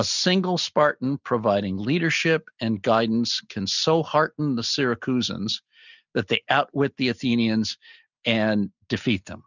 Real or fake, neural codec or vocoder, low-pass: real; none; 7.2 kHz